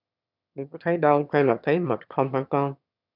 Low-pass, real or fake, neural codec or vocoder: 5.4 kHz; fake; autoencoder, 22.05 kHz, a latent of 192 numbers a frame, VITS, trained on one speaker